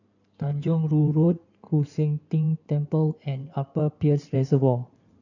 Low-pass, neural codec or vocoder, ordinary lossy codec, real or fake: 7.2 kHz; codec, 16 kHz in and 24 kHz out, 2.2 kbps, FireRedTTS-2 codec; none; fake